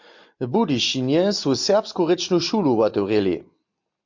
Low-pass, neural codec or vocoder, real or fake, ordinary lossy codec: 7.2 kHz; none; real; MP3, 48 kbps